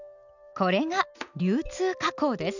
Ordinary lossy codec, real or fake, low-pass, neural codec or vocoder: none; real; 7.2 kHz; none